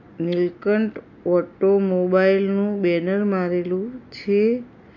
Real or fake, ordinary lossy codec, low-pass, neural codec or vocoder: real; MP3, 48 kbps; 7.2 kHz; none